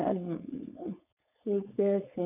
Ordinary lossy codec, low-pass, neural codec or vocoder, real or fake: none; 3.6 kHz; codec, 16 kHz, 4.8 kbps, FACodec; fake